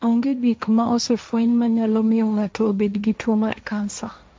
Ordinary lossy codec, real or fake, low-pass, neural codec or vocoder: none; fake; none; codec, 16 kHz, 1.1 kbps, Voila-Tokenizer